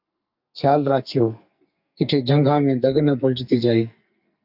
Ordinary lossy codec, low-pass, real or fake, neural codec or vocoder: AAC, 48 kbps; 5.4 kHz; fake; codec, 44.1 kHz, 2.6 kbps, SNAC